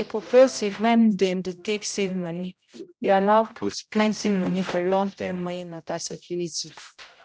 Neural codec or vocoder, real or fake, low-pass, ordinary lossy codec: codec, 16 kHz, 0.5 kbps, X-Codec, HuBERT features, trained on general audio; fake; none; none